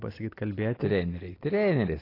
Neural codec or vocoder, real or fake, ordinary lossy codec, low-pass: none; real; AAC, 24 kbps; 5.4 kHz